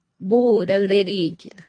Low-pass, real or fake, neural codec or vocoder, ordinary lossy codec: 9.9 kHz; fake; codec, 24 kHz, 1.5 kbps, HILCodec; AAC, 48 kbps